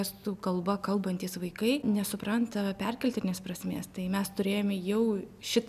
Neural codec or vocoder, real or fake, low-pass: none; real; 14.4 kHz